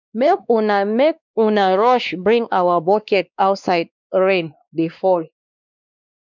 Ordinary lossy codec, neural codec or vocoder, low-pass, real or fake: none; codec, 16 kHz, 2 kbps, X-Codec, WavLM features, trained on Multilingual LibriSpeech; 7.2 kHz; fake